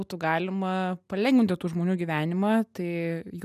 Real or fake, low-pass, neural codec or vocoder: real; 14.4 kHz; none